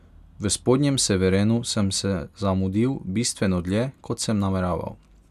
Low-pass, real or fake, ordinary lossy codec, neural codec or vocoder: 14.4 kHz; real; none; none